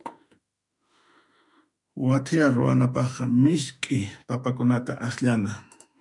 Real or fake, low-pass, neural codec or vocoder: fake; 10.8 kHz; autoencoder, 48 kHz, 32 numbers a frame, DAC-VAE, trained on Japanese speech